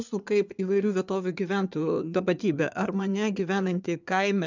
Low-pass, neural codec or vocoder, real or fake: 7.2 kHz; codec, 16 kHz in and 24 kHz out, 2.2 kbps, FireRedTTS-2 codec; fake